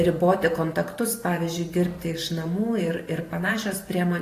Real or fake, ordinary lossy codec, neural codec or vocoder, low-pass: fake; AAC, 48 kbps; vocoder, 44.1 kHz, 128 mel bands every 512 samples, BigVGAN v2; 14.4 kHz